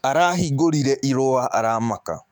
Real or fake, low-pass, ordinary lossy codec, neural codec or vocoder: fake; 19.8 kHz; MP3, 96 kbps; autoencoder, 48 kHz, 128 numbers a frame, DAC-VAE, trained on Japanese speech